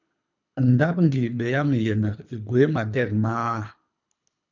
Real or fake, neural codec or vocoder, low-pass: fake; codec, 24 kHz, 3 kbps, HILCodec; 7.2 kHz